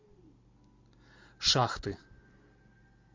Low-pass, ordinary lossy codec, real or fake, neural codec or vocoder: 7.2 kHz; MP3, 48 kbps; real; none